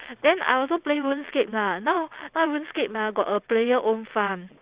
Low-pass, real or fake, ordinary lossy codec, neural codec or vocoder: 3.6 kHz; fake; Opus, 64 kbps; vocoder, 22.05 kHz, 80 mel bands, WaveNeXt